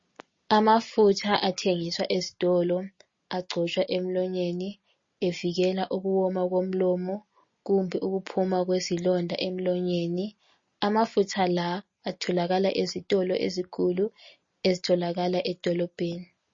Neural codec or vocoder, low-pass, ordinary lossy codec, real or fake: none; 7.2 kHz; MP3, 32 kbps; real